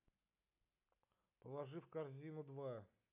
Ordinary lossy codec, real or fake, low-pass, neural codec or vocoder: MP3, 32 kbps; real; 3.6 kHz; none